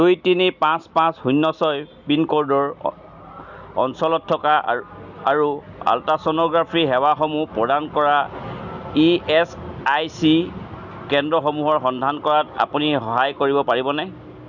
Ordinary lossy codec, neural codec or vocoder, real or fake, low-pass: none; none; real; 7.2 kHz